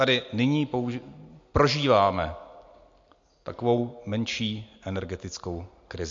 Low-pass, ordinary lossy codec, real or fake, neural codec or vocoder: 7.2 kHz; MP3, 48 kbps; real; none